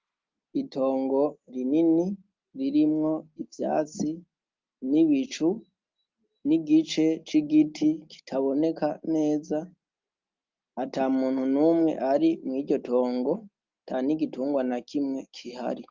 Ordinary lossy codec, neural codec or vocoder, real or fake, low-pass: Opus, 24 kbps; none; real; 7.2 kHz